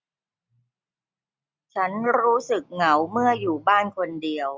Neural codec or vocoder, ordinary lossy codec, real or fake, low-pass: none; none; real; none